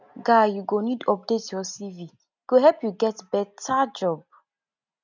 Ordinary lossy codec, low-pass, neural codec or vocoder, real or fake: none; 7.2 kHz; none; real